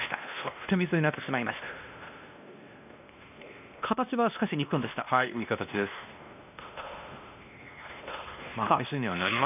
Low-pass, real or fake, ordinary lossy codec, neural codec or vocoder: 3.6 kHz; fake; none; codec, 16 kHz, 1 kbps, X-Codec, WavLM features, trained on Multilingual LibriSpeech